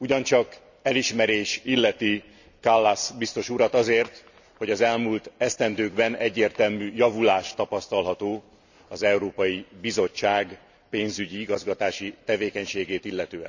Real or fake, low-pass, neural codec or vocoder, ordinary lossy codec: real; 7.2 kHz; none; none